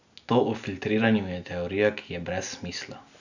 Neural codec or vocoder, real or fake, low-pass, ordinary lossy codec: none; real; 7.2 kHz; none